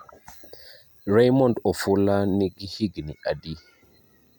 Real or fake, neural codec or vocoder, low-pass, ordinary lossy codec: real; none; 19.8 kHz; none